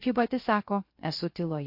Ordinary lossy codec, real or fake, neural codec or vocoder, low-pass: MP3, 32 kbps; fake; codec, 16 kHz, 0.3 kbps, FocalCodec; 5.4 kHz